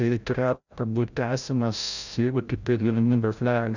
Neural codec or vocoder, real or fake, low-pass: codec, 16 kHz, 0.5 kbps, FreqCodec, larger model; fake; 7.2 kHz